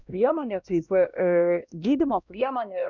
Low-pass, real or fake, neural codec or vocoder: 7.2 kHz; fake; codec, 16 kHz, 1 kbps, X-Codec, WavLM features, trained on Multilingual LibriSpeech